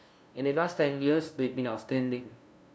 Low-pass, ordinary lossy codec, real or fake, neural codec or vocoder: none; none; fake; codec, 16 kHz, 0.5 kbps, FunCodec, trained on LibriTTS, 25 frames a second